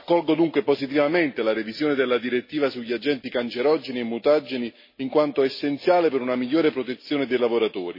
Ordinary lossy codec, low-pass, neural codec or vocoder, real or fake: MP3, 24 kbps; 5.4 kHz; none; real